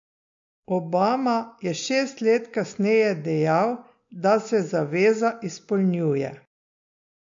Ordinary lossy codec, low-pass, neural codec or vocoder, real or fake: MP3, 48 kbps; 7.2 kHz; none; real